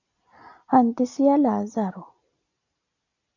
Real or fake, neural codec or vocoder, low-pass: real; none; 7.2 kHz